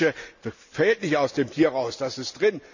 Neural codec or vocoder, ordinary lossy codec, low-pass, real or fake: none; none; 7.2 kHz; real